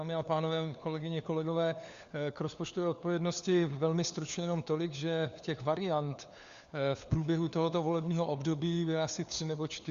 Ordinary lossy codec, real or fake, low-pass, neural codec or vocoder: Opus, 64 kbps; fake; 7.2 kHz; codec, 16 kHz, 4 kbps, FunCodec, trained on LibriTTS, 50 frames a second